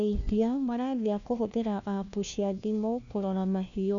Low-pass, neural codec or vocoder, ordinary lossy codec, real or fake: 7.2 kHz; codec, 16 kHz, 1 kbps, FunCodec, trained on LibriTTS, 50 frames a second; none; fake